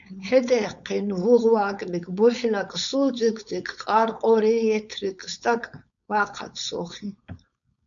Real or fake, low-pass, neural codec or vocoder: fake; 7.2 kHz; codec, 16 kHz, 4.8 kbps, FACodec